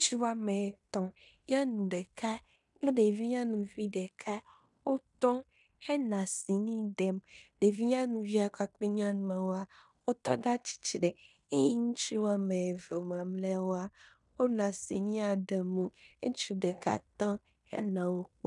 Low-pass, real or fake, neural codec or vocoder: 10.8 kHz; fake; codec, 16 kHz in and 24 kHz out, 0.9 kbps, LongCat-Audio-Codec, fine tuned four codebook decoder